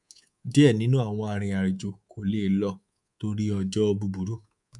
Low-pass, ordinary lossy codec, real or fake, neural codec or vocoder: 10.8 kHz; none; fake; codec, 24 kHz, 3.1 kbps, DualCodec